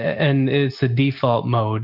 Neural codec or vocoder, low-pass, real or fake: none; 5.4 kHz; real